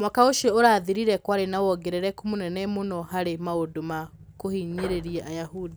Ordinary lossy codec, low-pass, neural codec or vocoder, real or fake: none; none; none; real